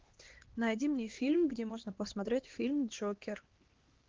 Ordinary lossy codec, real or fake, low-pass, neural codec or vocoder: Opus, 16 kbps; fake; 7.2 kHz; codec, 16 kHz, 2 kbps, X-Codec, HuBERT features, trained on LibriSpeech